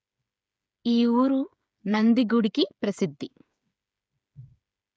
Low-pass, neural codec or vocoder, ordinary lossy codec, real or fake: none; codec, 16 kHz, 8 kbps, FreqCodec, smaller model; none; fake